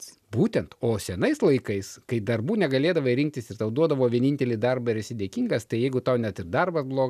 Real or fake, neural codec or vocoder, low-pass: real; none; 14.4 kHz